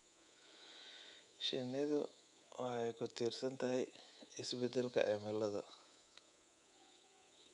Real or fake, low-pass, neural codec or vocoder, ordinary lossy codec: fake; 10.8 kHz; codec, 24 kHz, 3.1 kbps, DualCodec; none